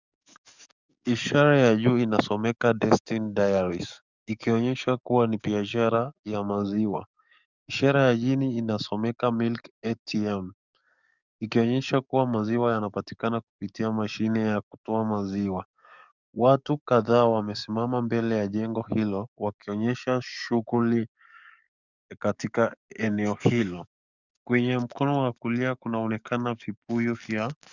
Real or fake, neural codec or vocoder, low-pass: fake; codec, 44.1 kHz, 7.8 kbps, DAC; 7.2 kHz